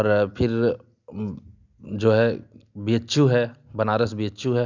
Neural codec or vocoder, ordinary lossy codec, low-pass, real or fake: none; none; 7.2 kHz; real